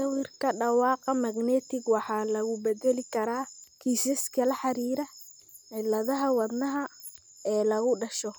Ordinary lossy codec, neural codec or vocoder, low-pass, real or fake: none; none; none; real